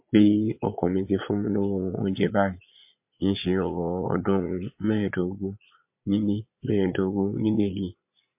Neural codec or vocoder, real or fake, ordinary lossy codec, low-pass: vocoder, 22.05 kHz, 80 mel bands, Vocos; fake; MP3, 32 kbps; 3.6 kHz